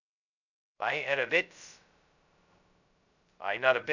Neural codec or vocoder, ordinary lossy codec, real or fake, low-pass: codec, 16 kHz, 0.2 kbps, FocalCodec; none; fake; 7.2 kHz